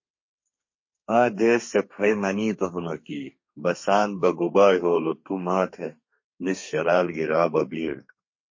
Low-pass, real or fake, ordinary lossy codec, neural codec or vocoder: 7.2 kHz; fake; MP3, 32 kbps; codec, 32 kHz, 1.9 kbps, SNAC